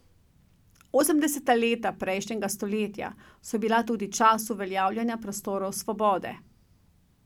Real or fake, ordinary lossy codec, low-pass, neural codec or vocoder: real; none; 19.8 kHz; none